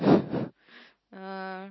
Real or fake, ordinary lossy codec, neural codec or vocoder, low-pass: real; MP3, 24 kbps; none; 7.2 kHz